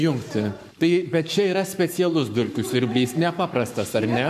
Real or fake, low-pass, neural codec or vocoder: fake; 14.4 kHz; codec, 44.1 kHz, 7.8 kbps, Pupu-Codec